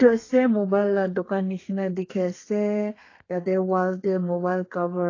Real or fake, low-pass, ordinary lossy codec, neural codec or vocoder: fake; 7.2 kHz; AAC, 32 kbps; codec, 32 kHz, 1.9 kbps, SNAC